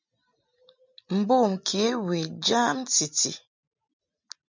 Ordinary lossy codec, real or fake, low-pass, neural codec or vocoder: MP3, 64 kbps; real; 7.2 kHz; none